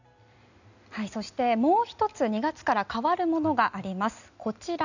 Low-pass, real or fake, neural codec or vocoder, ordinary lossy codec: 7.2 kHz; real; none; none